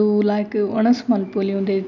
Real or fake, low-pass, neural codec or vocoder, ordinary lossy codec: real; 7.2 kHz; none; none